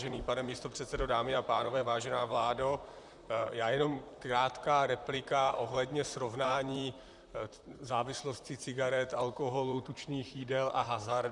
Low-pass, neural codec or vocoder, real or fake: 10.8 kHz; vocoder, 44.1 kHz, 128 mel bands, Pupu-Vocoder; fake